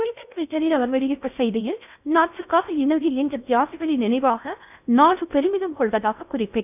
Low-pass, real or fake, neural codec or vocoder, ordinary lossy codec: 3.6 kHz; fake; codec, 16 kHz in and 24 kHz out, 0.6 kbps, FocalCodec, streaming, 4096 codes; none